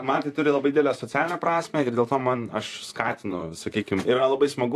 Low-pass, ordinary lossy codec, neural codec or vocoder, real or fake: 14.4 kHz; AAC, 64 kbps; vocoder, 44.1 kHz, 128 mel bands, Pupu-Vocoder; fake